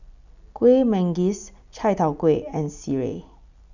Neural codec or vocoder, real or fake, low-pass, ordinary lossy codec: none; real; 7.2 kHz; none